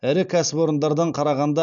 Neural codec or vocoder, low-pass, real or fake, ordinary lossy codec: none; 7.2 kHz; real; none